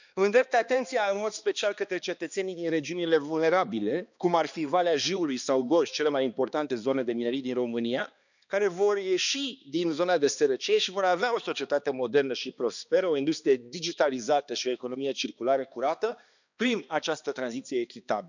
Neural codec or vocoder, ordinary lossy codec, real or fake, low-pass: codec, 16 kHz, 2 kbps, X-Codec, HuBERT features, trained on balanced general audio; none; fake; 7.2 kHz